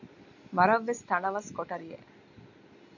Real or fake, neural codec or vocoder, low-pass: real; none; 7.2 kHz